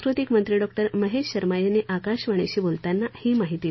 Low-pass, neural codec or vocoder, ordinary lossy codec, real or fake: 7.2 kHz; none; MP3, 24 kbps; real